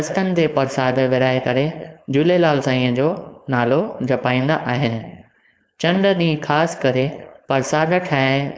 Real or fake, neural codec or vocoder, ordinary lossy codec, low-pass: fake; codec, 16 kHz, 4.8 kbps, FACodec; none; none